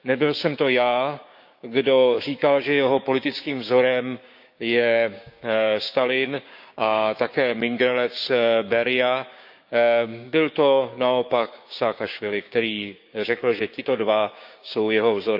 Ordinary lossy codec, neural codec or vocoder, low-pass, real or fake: none; codec, 16 kHz, 6 kbps, DAC; 5.4 kHz; fake